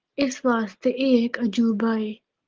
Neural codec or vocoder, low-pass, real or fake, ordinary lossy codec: none; 7.2 kHz; real; Opus, 16 kbps